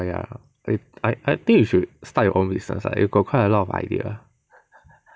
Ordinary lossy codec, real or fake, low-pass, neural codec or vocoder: none; real; none; none